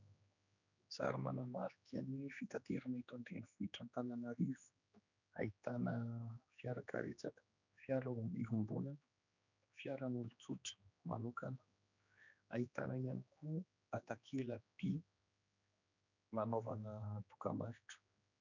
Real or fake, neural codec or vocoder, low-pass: fake; codec, 16 kHz, 2 kbps, X-Codec, HuBERT features, trained on general audio; 7.2 kHz